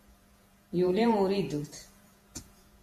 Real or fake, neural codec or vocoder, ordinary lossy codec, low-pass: fake; vocoder, 48 kHz, 128 mel bands, Vocos; MP3, 64 kbps; 14.4 kHz